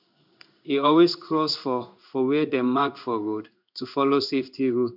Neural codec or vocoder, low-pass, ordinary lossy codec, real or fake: codec, 16 kHz in and 24 kHz out, 1 kbps, XY-Tokenizer; 5.4 kHz; none; fake